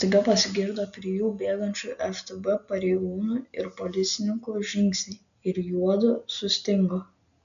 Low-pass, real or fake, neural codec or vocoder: 7.2 kHz; real; none